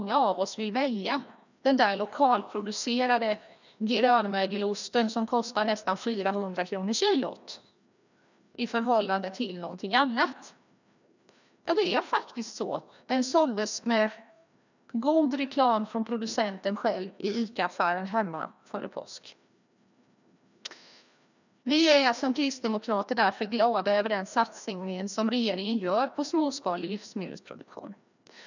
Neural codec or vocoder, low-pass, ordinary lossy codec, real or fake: codec, 16 kHz, 1 kbps, FreqCodec, larger model; 7.2 kHz; none; fake